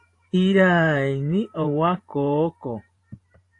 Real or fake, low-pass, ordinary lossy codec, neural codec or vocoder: fake; 10.8 kHz; AAC, 48 kbps; vocoder, 44.1 kHz, 128 mel bands every 512 samples, BigVGAN v2